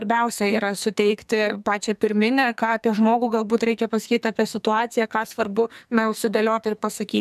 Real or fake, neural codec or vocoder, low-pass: fake; codec, 44.1 kHz, 2.6 kbps, SNAC; 14.4 kHz